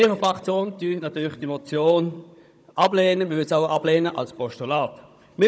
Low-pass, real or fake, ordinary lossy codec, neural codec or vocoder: none; fake; none; codec, 16 kHz, 16 kbps, FunCodec, trained on Chinese and English, 50 frames a second